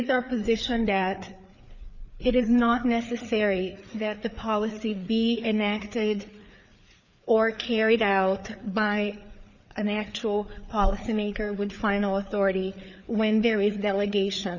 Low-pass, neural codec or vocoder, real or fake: 7.2 kHz; codec, 16 kHz, 4 kbps, FreqCodec, larger model; fake